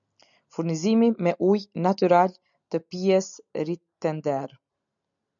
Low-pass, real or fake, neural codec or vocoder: 7.2 kHz; real; none